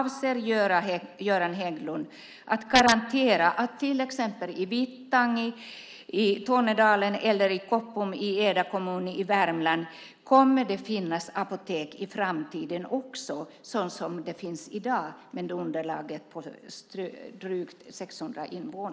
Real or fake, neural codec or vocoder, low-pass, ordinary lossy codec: real; none; none; none